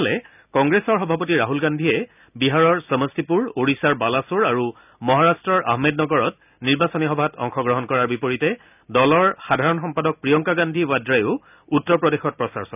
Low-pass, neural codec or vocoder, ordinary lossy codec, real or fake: 3.6 kHz; none; none; real